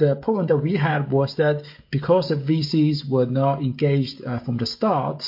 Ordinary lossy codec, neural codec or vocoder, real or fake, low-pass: MP3, 32 kbps; codec, 16 kHz, 16 kbps, FreqCodec, smaller model; fake; 5.4 kHz